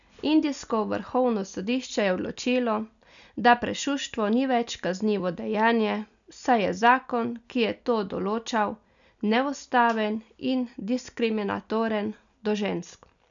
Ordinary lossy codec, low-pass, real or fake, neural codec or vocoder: none; 7.2 kHz; real; none